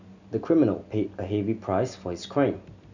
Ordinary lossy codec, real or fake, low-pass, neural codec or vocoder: none; real; 7.2 kHz; none